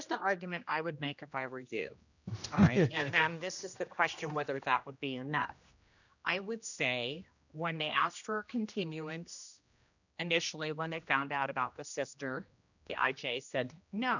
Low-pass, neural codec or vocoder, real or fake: 7.2 kHz; codec, 16 kHz, 1 kbps, X-Codec, HuBERT features, trained on general audio; fake